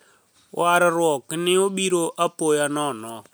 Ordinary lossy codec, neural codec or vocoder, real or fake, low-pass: none; none; real; none